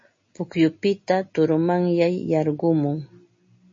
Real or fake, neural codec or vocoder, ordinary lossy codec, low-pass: real; none; MP3, 32 kbps; 7.2 kHz